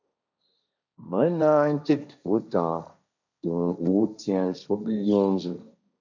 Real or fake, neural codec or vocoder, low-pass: fake; codec, 16 kHz, 1.1 kbps, Voila-Tokenizer; 7.2 kHz